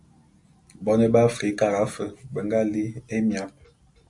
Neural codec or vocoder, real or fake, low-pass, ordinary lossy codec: none; real; 10.8 kHz; MP3, 96 kbps